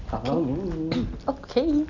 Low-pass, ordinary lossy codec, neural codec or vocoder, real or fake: 7.2 kHz; none; none; real